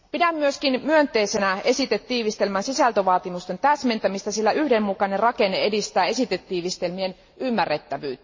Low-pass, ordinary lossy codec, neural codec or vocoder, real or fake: 7.2 kHz; MP3, 32 kbps; none; real